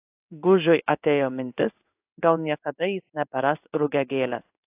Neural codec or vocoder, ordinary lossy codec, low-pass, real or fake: codec, 16 kHz in and 24 kHz out, 1 kbps, XY-Tokenizer; AAC, 32 kbps; 3.6 kHz; fake